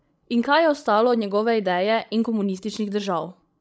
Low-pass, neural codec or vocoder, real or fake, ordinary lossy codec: none; codec, 16 kHz, 16 kbps, FreqCodec, larger model; fake; none